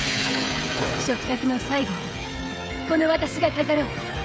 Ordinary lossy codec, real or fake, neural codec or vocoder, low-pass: none; fake; codec, 16 kHz, 16 kbps, FreqCodec, smaller model; none